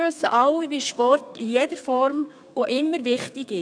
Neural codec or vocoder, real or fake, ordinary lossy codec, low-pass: codec, 32 kHz, 1.9 kbps, SNAC; fake; none; 9.9 kHz